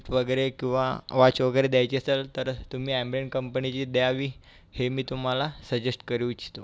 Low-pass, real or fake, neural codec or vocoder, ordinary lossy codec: none; real; none; none